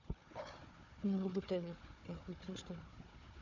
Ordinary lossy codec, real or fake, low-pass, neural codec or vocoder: none; fake; 7.2 kHz; codec, 16 kHz, 4 kbps, FunCodec, trained on Chinese and English, 50 frames a second